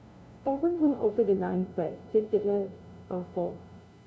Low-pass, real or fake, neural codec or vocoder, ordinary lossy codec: none; fake; codec, 16 kHz, 0.5 kbps, FunCodec, trained on LibriTTS, 25 frames a second; none